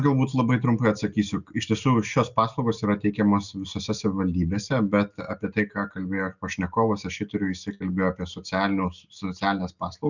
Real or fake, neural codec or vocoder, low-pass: real; none; 7.2 kHz